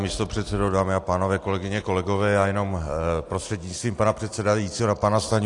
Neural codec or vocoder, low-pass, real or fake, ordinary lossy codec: none; 10.8 kHz; real; AAC, 48 kbps